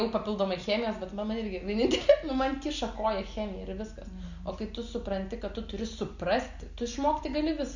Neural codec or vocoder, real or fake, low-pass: none; real; 7.2 kHz